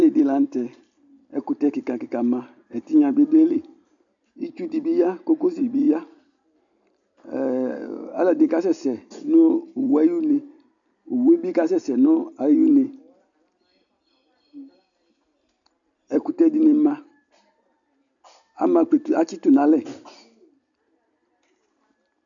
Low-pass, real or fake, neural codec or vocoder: 7.2 kHz; real; none